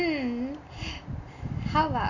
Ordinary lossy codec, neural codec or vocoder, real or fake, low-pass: none; none; real; 7.2 kHz